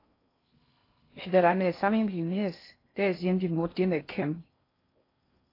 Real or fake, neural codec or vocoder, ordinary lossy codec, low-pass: fake; codec, 16 kHz in and 24 kHz out, 0.6 kbps, FocalCodec, streaming, 2048 codes; AAC, 24 kbps; 5.4 kHz